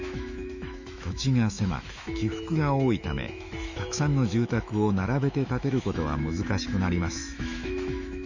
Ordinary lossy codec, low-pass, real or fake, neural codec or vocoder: none; 7.2 kHz; fake; autoencoder, 48 kHz, 128 numbers a frame, DAC-VAE, trained on Japanese speech